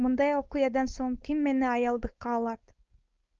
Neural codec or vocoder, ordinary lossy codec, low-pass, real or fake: codec, 16 kHz, 4.8 kbps, FACodec; Opus, 24 kbps; 7.2 kHz; fake